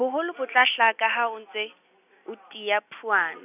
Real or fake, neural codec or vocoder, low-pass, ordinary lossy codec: real; none; 3.6 kHz; none